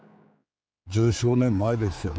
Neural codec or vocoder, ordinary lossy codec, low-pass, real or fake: codec, 16 kHz, 4 kbps, X-Codec, HuBERT features, trained on general audio; none; none; fake